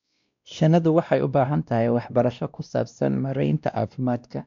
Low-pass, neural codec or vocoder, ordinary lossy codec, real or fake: 7.2 kHz; codec, 16 kHz, 1 kbps, X-Codec, WavLM features, trained on Multilingual LibriSpeech; MP3, 64 kbps; fake